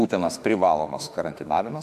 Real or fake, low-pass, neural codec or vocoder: fake; 14.4 kHz; autoencoder, 48 kHz, 32 numbers a frame, DAC-VAE, trained on Japanese speech